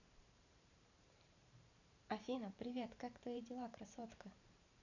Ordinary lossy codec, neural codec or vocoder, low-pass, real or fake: none; vocoder, 44.1 kHz, 128 mel bands every 512 samples, BigVGAN v2; 7.2 kHz; fake